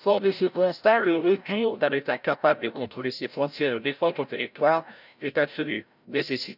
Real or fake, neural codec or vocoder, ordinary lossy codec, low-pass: fake; codec, 16 kHz, 0.5 kbps, FreqCodec, larger model; none; 5.4 kHz